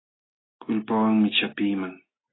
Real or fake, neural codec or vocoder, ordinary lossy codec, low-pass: real; none; AAC, 16 kbps; 7.2 kHz